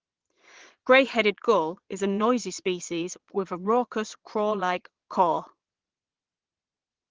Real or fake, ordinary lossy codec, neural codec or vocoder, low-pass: fake; Opus, 16 kbps; vocoder, 22.05 kHz, 80 mel bands, Vocos; 7.2 kHz